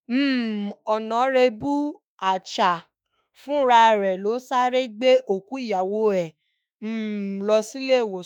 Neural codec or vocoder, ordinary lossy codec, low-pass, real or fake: autoencoder, 48 kHz, 32 numbers a frame, DAC-VAE, trained on Japanese speech; none; 19.8 kHz; fake